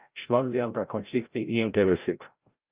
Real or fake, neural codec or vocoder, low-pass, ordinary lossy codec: fake; codec, 16 kHz, 0.5 kbps, FreqCodec, larger model; 3.6 kHz; Opus, 24 kbps